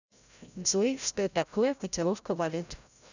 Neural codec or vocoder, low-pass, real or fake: codec, 16 kHz, 0.5 kbps, FreqCodec, larger model; 7.2 kHz; fake